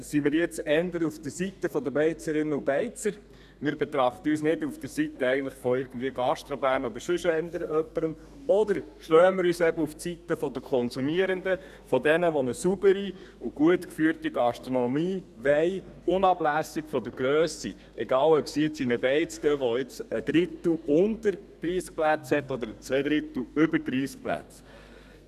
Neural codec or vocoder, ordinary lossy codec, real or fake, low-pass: codec, 32 kHz, 1.9 kbps, SNAC; none; fake; 14.4 kHz